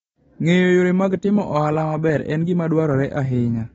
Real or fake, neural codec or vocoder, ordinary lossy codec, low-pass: real; none; AAC, 24 kbps; 14.4 kHz